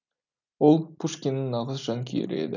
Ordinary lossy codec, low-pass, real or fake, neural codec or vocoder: none; 7.2 kHz; real; none